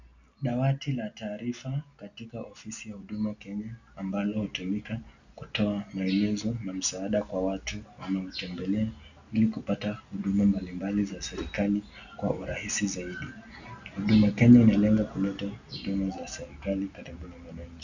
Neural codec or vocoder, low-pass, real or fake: none; 7.2 kHz; real